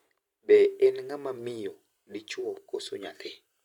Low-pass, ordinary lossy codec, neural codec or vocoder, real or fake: 19.8 kHz; none; vocoder, 44.1 kHz, 128 mel bands every 512 samples, BigVGAN v2; fake